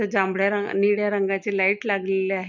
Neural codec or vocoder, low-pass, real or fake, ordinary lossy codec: none; 7.2 kHz; real; none